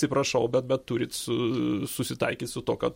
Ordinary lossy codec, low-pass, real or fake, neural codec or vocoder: MP3, 64 kbps; 19.8 kHz; real; none